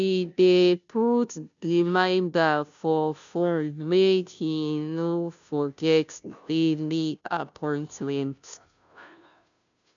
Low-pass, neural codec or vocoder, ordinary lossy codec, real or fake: 7.2 kHz; codec, 16 kHz, 0.5 kbps, FunCodec, trained on Chinese and English, 25 frames a second; none; fake